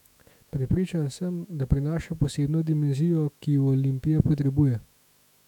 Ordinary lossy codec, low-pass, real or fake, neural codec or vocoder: none; 19.8 kHz; fake; autoencoder, 48 kHz, 128 numbers a frame, DAC-VAE, trained on Japanese speech